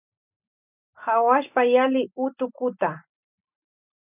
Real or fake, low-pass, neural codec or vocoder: real; 3.6 kHz; none